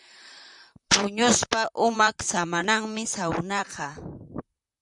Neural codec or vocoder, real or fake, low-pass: vocoder, 44.1 kHz, 128 mel bands, Pupu-Vocoder; fake; 10.8 kHz